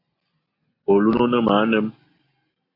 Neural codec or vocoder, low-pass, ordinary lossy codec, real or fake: none; 5.4 kHz; AAC, 24 kbps; real